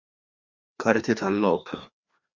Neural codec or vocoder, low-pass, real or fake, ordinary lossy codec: codec, 16 kHz, 2 kbps, FreqCodec, larger model; 7.2 kHz; fake; Opus, 64 kbps